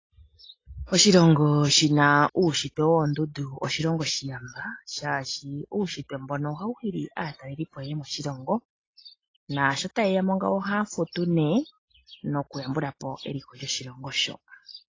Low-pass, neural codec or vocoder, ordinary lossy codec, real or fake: 7.2 kHz; none; AAC, 32 kbps; real